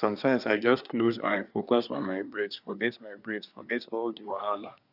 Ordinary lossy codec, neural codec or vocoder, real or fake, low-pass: none; codec, 24 kHz, 1 kbps, SNAC; fake; 5.4 kHz